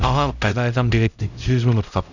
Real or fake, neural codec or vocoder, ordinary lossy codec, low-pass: fake; codec, 16 kHz, 0.5 kbps, X-Codec, HuBERT features, trained on LibriSpeech; none; 7.2 kHz